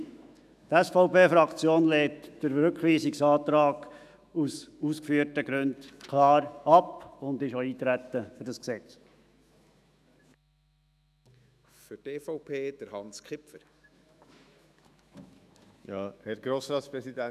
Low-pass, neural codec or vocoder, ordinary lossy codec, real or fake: 14.4 kHz; autoencoder, 48 kHz, 128 numbers a frame, DAC-VAE, trained on Japanese speech; none; fake